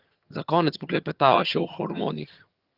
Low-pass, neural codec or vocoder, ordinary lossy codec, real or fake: 5.4 kHz; vocoder, 22.05 kHz, 80 mel bands, HiFi-GAN; Opus, 24 kbps; fake